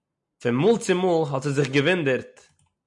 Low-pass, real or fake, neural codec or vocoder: 10.8 kHz; real; none